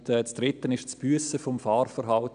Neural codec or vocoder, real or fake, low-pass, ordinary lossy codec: none; real; 9.9 kHz; none